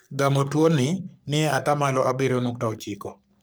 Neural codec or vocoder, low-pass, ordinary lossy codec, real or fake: codec, 44.1 kHz, 3.4 kbps, Pupu-Codec; none; none; fake